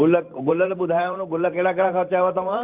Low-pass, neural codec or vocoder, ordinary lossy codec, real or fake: 3.6 kHz; vocoder, 44.1 kHz, 128 mel bands every 512 samples, BigVGAN v2; Opus, 24 kbps; fake